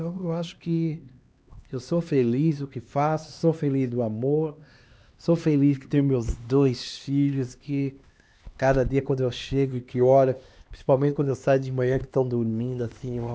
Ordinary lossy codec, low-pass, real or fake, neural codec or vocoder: none; none; fake; codec, 16 kHz, 2 kbps, X-Codec, HuBERT features, trained on LibriSpeech